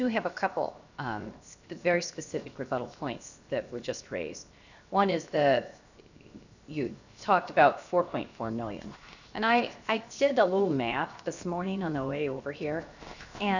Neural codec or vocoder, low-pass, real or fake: codec, 16 kHz, 0.7 kbps, FocalCodec; 7.2 kHz; fake